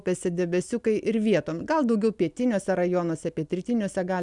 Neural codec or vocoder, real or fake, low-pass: none; real; 10.8 kHz